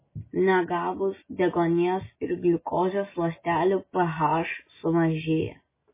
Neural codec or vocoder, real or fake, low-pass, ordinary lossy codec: none; real; 3.6 kHz; MP3, 16 kbps